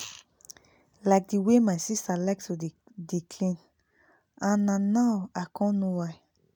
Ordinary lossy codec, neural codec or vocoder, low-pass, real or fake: none; none; 19.8 kHz; real